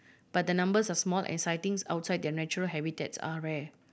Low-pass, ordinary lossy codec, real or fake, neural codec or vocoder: none; none; real; none